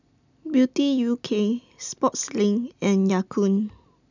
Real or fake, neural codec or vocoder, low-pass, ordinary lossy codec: real; none; 7.2 kHz; none